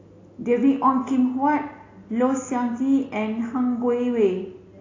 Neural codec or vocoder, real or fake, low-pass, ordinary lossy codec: none; real; 7.2 kHz; AAC, 32 kbps